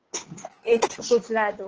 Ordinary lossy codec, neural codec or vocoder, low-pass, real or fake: Opus, 16 kbps; codec, 16 kHz, 2 kbps, FunCodec, trained on Chinese and English, 25 frames a second; 7.2 kHz; fake